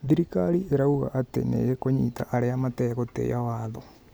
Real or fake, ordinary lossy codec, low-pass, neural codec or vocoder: real; none; none; none